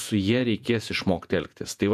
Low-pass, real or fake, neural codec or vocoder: 14.4 kHz; fake; vocoder, 48 kHz, 128 mel bands, Vocos